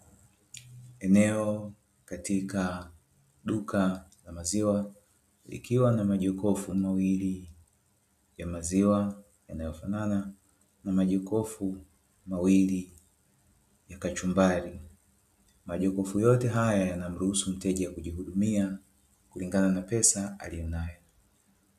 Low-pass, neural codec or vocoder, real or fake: 14.4 kHz; none; real